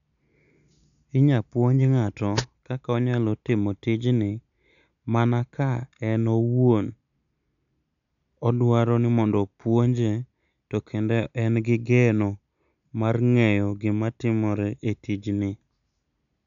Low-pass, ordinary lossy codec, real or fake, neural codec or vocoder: 7.2 kHz; none; real; none